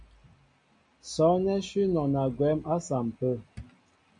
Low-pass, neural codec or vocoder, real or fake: 9.9 kHz; none; real